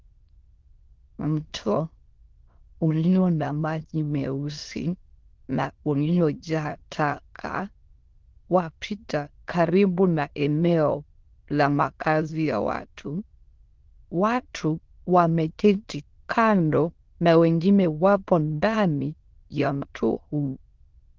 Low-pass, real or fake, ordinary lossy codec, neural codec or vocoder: 7.2 kHz; fake; Opus, 16 kbps; autoencoder, 22.05 kHz, a latent of 192 numbers a frame, VITS, trained on many speakers